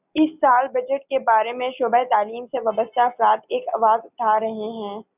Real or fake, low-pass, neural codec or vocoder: real; 3.6 kHz; none